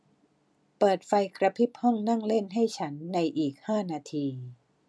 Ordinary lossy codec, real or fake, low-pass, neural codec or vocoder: none; real; none; none